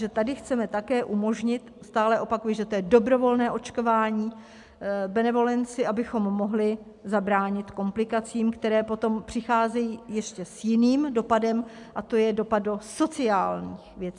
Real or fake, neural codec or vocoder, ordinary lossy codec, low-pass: real; none; MP3, 96 kbps; 10.8 kHz